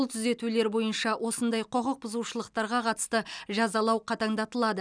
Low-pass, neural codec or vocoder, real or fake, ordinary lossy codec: 9.9 kHz; none; real; none